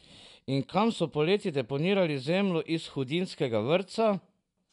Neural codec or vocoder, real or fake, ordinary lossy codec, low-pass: none; real; none; 10.8 kHz